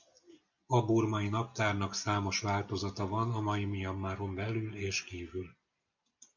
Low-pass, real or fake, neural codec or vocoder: 7.2 kHz; real; none